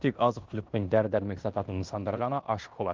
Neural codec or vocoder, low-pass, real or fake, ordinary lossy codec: codec, 16 kHz in and 24 kHz out, 0.9 kbps, LongCat-Audio-Codec, fine tuned four codebook decoder; 7.2 kHz; fake; Opus, 32 kbps